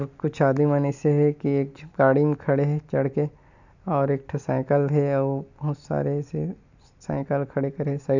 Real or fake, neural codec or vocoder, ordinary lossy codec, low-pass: real; none; none; 7.2 kHz